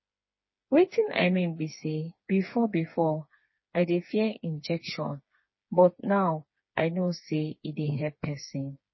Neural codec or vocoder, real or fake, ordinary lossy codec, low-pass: codec, 16 kHz, 4 kbps, FreqCodec, smaller model; fake; MP3, 24 kbps; 7.2 kHz